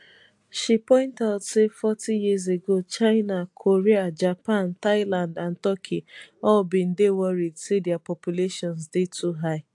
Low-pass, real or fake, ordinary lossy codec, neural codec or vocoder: 10.8 kHz; real; AAC, 64 kbps; none